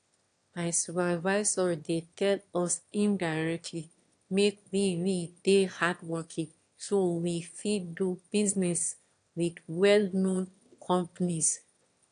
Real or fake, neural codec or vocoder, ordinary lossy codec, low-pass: fake; autoencoder, 22.05 kHz, a latent of 192 numbers a frame, VITS, trained on one speaker; AAC, 64 kbps; 9.9 kHz